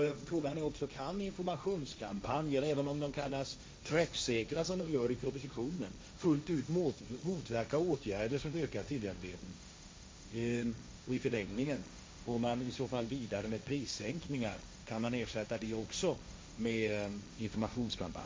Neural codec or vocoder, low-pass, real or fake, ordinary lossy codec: codec, 16 kHz, 1.1 kbps, Voila-Tokenizer; none; fake; none